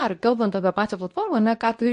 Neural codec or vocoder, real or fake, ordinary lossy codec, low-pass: codec, 24 kHz, 0.9 kbps, WavTokenizer, medium speech release version 2; fake; MP3, 48 kbps; 10.8 kHz